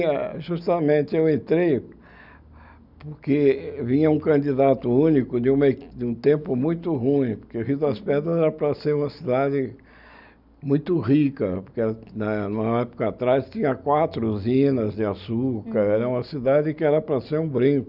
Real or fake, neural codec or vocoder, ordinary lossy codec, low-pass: real; none; none; 5.4 kHz